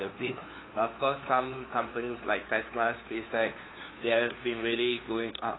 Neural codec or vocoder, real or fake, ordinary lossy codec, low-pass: codec, 16 kHz, 2 kbps, FunCodec, trained on LibriTTS, 25 frames a second; fake; AAC, 16 kbps; 7.2 kHz